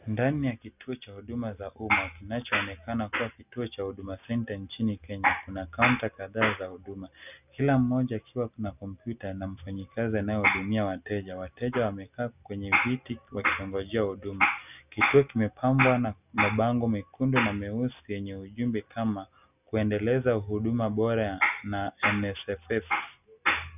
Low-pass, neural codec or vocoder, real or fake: 3.6 kHz; none; real